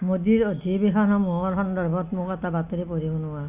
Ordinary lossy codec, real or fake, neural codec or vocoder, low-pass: none; real; none; 3.6 kHz